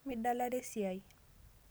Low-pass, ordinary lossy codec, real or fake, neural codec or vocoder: none; none; real; none